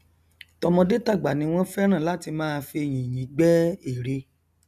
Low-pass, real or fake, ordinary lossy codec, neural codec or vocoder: 14.4 kHz; real; none; none